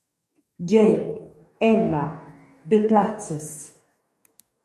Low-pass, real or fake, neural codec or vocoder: 14.4 kHz; fake; codec, 44.1 kHz, 2.6 kbps, DAC